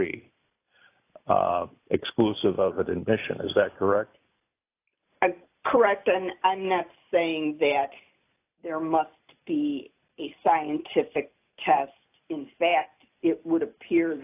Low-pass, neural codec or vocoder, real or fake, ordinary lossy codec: 3.6 kHz; none; real; AAC, 24 kbps